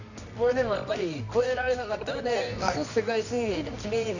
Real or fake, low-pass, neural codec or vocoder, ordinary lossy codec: fake; 7.2 kHz; codec, 24 kHz, 0.9 kbps, WavTokenizer, medium music audio release; none